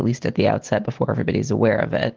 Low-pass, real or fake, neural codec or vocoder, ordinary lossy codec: 7.2 kHz; real; none; Opus, 16 kbps